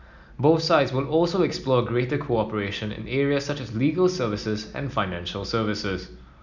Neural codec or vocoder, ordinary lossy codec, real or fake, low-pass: none; none; real; 7.2 kHz